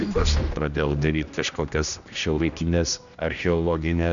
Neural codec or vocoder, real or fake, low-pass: codec, 16 kHz, 1 kbps, X-Codec, HuBERT features, trained on general audio; fake; 7.2 kHz